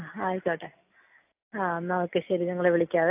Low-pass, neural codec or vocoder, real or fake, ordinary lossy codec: 3.6 kHz; none; real; AAC, 32 kbps